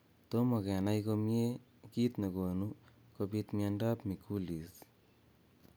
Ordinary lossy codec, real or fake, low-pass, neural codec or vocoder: none; real; none; none